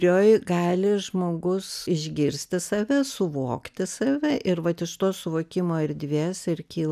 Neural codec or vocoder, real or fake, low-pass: none; real; 14.4 kHz